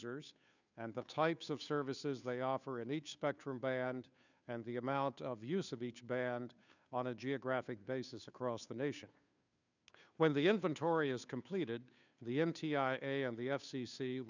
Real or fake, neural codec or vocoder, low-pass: fake; codec, 16 kHz, 2 kbps, FunCodec, trained on Chinese and English, 25 frames a second; 7.2 kHz